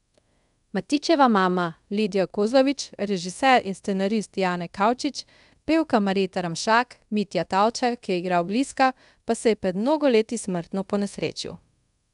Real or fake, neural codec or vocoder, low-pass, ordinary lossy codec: fake; codec, 24 kHz, 0.5 kbps, DualCodec; 10.8 kHz; none